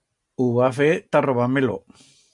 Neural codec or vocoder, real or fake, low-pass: none; real; 10.8 kHz